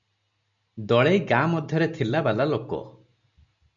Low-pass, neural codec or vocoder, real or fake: 7.2 kHz; none; real